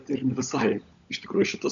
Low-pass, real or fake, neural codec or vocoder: 7.2 kHz; fake; codec, 16 kHz, 16 kbps, FunCodec, trained on LibriTTS, 50 frames a second